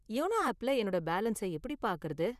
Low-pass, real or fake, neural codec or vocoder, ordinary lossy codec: 14.4 kHz; fake; vocoder, 44.1 kHz, 128 mel bands, Pupu-Vocoder; none